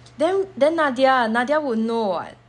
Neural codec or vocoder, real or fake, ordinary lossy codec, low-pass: none; real; none; 10.8 kHz